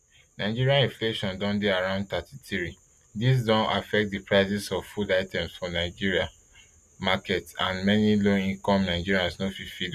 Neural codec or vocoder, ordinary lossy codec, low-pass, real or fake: none; none; 14.4 kHz; real